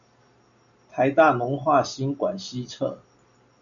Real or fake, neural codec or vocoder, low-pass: real; none; 7.2 kHz